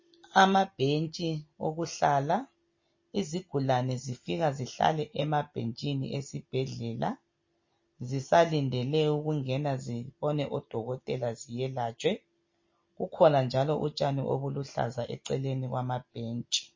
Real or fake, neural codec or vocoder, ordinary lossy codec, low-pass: real; none; MP3, 32 kbps; 7.2 kHz